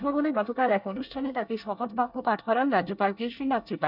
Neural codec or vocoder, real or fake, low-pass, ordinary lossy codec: codec, 24 kHz, 1 kbps, SNAC; fake; 5.4 kHz; none